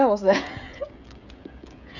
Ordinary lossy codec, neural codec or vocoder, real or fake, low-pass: none; codec, 16 kHz, 16 kbps, FreqCodec, smaller model; fake; 7.2 kHz